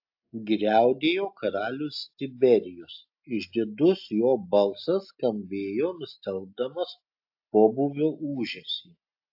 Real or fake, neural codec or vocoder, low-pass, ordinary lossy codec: real; none; 5.4 kHz; AAC, 48 kbps